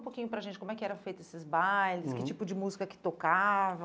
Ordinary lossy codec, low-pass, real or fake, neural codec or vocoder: none; none; real; none